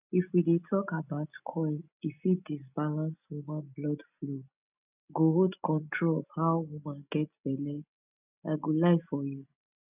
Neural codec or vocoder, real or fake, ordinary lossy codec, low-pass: none; real; none; 3.6 kHz